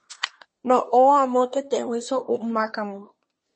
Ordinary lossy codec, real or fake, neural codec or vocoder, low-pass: MP3, 32 kbps; fake; codec, 24 kHz, 1 kbps, SNAC; 10.8 kHz